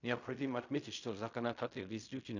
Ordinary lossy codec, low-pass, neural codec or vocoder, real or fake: none; 7.2 kHz; codec, 16 kHz in and 24 kHz out, 0.4 kbps, LongCat-Audio-Codec, fine tuned four codebook decoder; fake